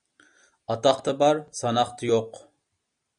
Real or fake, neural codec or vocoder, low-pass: real; none; 9.9 kHz